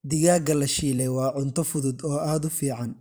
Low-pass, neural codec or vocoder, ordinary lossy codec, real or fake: none; vocoder, 44.1 kHz, 128 mel bands every 512 samples, BigVGAN v2; none; fake